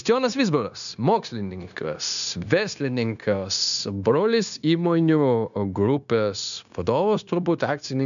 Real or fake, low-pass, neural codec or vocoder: fake; 7.2 kHz; codec, 16 kHz, 0.9 kbps, LongCat-Audio-Codec